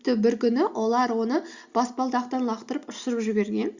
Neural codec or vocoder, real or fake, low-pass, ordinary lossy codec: none; real; 7.2 kHz; Opus, 64 kbps